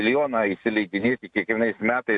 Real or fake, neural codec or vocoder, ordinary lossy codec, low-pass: real; none; AAC, 64 kbps; 10.8 kHz